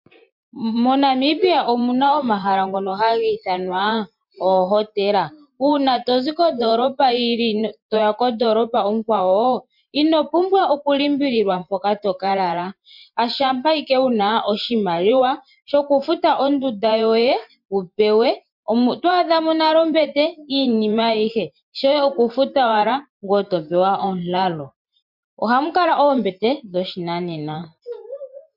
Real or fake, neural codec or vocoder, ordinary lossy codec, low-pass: fake; vocoder, 44.1 kHz, 128 mel bands every 512 samples, BigVGAN v2; MP3, 48 kbps; 5.4 kHz